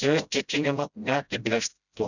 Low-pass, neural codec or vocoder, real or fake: 7.2 kHz; codec, 16 kHz, 0.5 kbps, FreqCodec, smaller model; fake